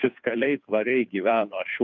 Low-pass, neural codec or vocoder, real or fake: 7.2 kHz; codec, 16 kHz, 2 kbps, FunCodec, trained on Chinese and English, 25 frames a second; fake